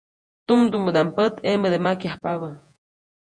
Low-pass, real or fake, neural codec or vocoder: 9.9 kHz; fake; vocoder, 48 kHz, 128 mel bands, Vocos